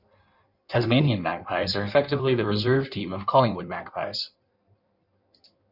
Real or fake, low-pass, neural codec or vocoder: fake; 5.4 kHz; codec, 16 kHz in and 24 kHz out, 1.1 kbps, FireRedTTS-2 codec